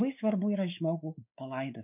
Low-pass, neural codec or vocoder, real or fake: 3.6 kHz; codec, 16 kHz, 4 kbps, X-Codec, WavLM features, trained on Multilingual LibriSpeech; fake